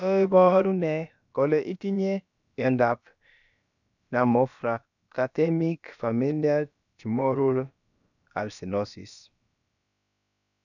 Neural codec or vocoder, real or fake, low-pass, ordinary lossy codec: codec, 16 kHz, about 1 kbps, DyCAST, with the encoder's durations; fake; 7.2 kHz; none